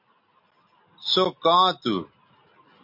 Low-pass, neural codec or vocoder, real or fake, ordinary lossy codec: 5.4 kHz; none; real; MP3, 48 kbps